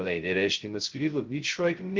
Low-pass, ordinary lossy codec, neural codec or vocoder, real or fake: 7.2 kHz; Opus, 16 kbps; codec, 16 kHz, 0.2 kbps, FocalCodec; fake